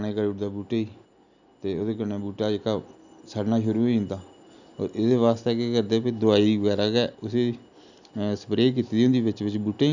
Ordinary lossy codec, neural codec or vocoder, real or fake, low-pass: none; none; real; 7.2 kHz